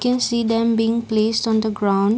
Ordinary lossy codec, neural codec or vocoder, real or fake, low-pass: none; none; real; none